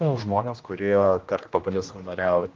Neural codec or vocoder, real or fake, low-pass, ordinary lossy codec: codec, 16 kHz, 1 kbps, X-Codec, HuBERT features, trained on general audio; fake; 7.2 kHz; Opus, 32 kbps